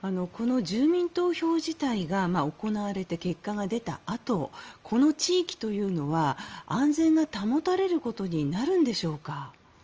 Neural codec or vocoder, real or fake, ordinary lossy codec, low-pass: none; real; Opus, 24 kbps; 7.2 kHz